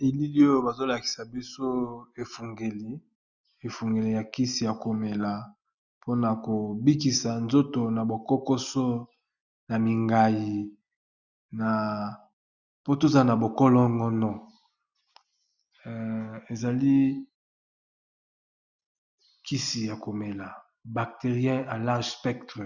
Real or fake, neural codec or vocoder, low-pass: real; none; 7.2 kHz